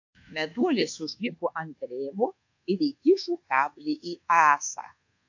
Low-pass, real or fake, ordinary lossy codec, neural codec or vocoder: 7.2 kHz; fake; AAC, 48 kbps; codec, 24 kHz, 1.2 kbps, DualCodec